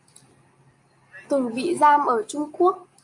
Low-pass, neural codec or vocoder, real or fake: 10.8 kHz; none; real